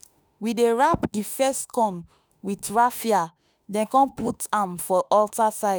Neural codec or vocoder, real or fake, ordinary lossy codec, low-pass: autoencoder, 48 kHz, 32 numbers a frame, DAC-VAE, trained on Japanese speech; fake; none; none